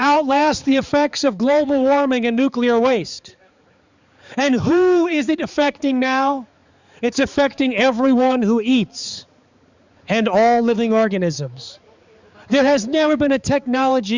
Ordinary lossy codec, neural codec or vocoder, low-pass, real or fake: Opus, 64 kbps; codec, 16 kHz, 4 kbps, X-Codec, HuBERT features, trained on balanced general audio; 7.2 kHz; fake